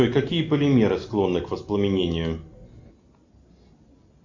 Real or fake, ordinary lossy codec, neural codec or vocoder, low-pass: real; AAC, 48 kbps; none; 7.2 kHz